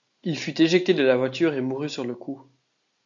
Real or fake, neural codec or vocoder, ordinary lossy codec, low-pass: real; none; AAC, 64 kbps; 7.2 kHz